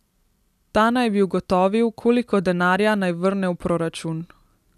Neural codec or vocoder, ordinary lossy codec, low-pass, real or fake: none; none; 14.4 kHz; real